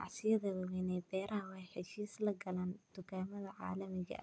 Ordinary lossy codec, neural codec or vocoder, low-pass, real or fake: none; none; none; real